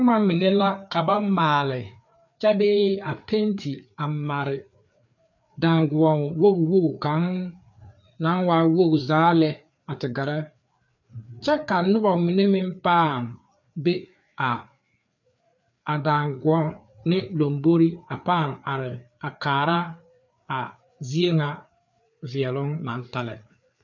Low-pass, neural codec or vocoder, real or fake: 7.2 kHz; codec, 16 kHz, 4 kbps, FreqCodec, larger model; fake